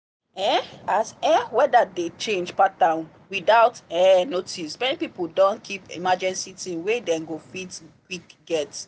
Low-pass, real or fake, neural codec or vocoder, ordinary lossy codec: none; real; none; none